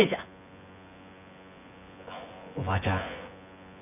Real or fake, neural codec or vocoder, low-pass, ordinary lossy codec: fake; vocoder, 24 kHz, 100 mel bands, Vocos; 3.6 kHz; none